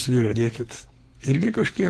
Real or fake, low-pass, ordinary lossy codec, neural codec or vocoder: fake; 14.4 kHz; Opus, 16 kbps; codec, 32 kHz, 1.9 kbps, SNAC